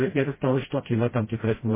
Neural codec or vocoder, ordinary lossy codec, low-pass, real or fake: codec, 16 kHz, 0.5 kbps, FreqCodec, smaller model; MP3, 16 kbps; 3.6 kHz; fake